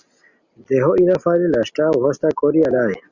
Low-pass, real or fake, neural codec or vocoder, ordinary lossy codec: 7.2 kHz; real; none; Opus, 64 kbps